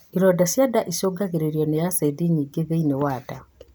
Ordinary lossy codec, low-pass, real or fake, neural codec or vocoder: none; none; real; none